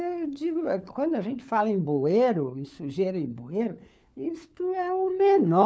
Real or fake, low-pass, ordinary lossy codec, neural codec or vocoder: fake; none; none; codec, 16 kHz, 4 kbps, FunCodec, trained on LibriTTS, 50 frames a second